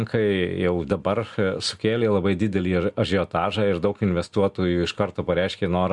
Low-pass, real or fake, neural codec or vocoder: 10.8 kHz; real; none